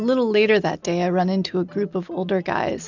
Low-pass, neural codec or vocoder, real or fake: 7.2 kHz; none; real